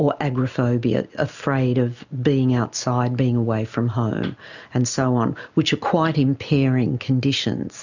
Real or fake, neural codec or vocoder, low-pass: real; none; 7.2 kHz